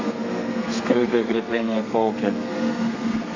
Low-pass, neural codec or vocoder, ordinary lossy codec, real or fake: 7.2 kHz; codec, 32 kHz, 1.9 kbps, SNAC; MP3, 48 kbps; fake